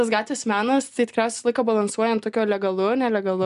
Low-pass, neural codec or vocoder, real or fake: 10.8 kHz; none; real